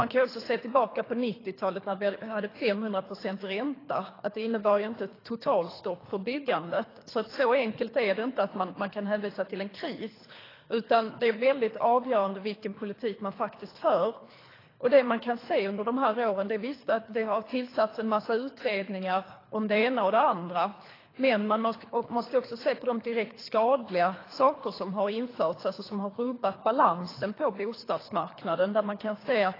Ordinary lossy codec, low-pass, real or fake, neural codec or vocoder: AAC, 24 kbps; 5.4 kHz; fake; codec, 24 kHz, 3 kbps, HILCodec